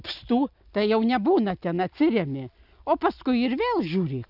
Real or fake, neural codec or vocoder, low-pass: real; none; 5.4 kHz